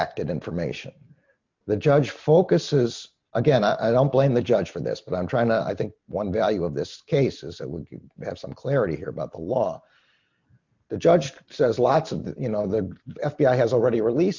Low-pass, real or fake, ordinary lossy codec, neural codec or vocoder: 7.2 kHz; real; MP3, 64 kbps; none